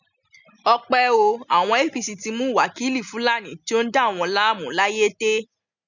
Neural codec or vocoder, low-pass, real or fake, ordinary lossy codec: none; 7.2 kHz; real; none